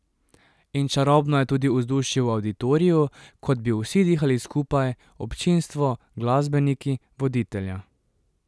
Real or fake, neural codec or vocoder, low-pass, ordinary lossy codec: real; none; none; none